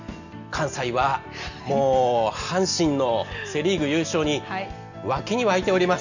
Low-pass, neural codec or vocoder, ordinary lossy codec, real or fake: 7.2 kHz; none; none; real